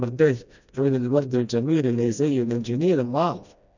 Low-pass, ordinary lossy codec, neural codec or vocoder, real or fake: 7.2 kHz; none; codec, 16 kHz, 1 kbps, FreqCodec, smaller model; fake